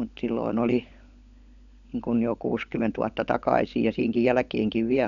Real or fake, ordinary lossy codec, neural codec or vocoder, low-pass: fake; none; codec, 16 kHz, 16 kbps, FunCodec, trained on LibriTTS, 50 frames a second; 7.2 kHz